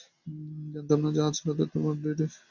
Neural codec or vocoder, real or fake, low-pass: none; real; 7.2 kHz